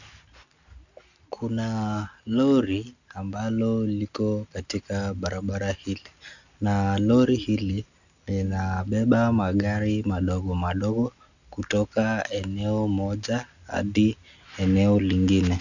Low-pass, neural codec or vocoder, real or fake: 7.2 kHz; none; real